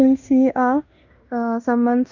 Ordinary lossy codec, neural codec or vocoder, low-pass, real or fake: MP3, 64 kbps; codec, 16 kHz in and 24 kHz out, 0.9 kbps, LongCat-Audio-Codec, fine tuned four codebook decoder; 7.2 kHz; fake